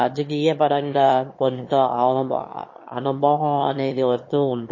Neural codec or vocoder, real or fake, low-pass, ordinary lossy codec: autoencoder, 22.05 kHz, a latent of 192 numbers a frame, VITS, trained on one speaker; fake; 7.2 kHz; MP3, 32 kbps